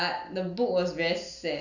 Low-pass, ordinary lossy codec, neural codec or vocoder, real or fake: 7.2 kHz; none; none; real